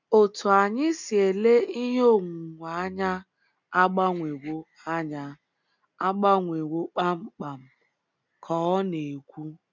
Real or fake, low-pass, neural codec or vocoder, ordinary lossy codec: real; 7.2 kHz; none; none